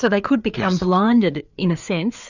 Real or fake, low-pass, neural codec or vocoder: fake; 7.2 kHz; codec, 16 kHz, 4 kbps, FreqCodec, larger model